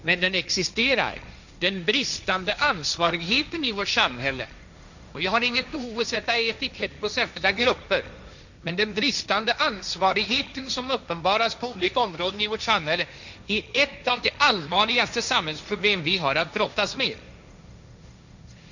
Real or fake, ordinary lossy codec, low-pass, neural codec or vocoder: fake; none; 7.2 kHz; codec, 16 kHz, 1.1 kbps, Voila-Tokenizer